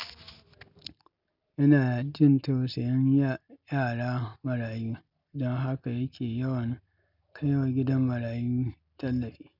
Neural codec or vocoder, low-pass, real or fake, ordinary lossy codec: none; 5.4 kHz; real; none